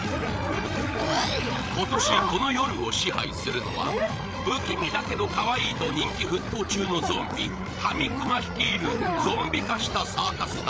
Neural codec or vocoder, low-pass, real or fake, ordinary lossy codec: codec, 16 kHz, 8 kbps, FreqCodec, larger model; none; fake; none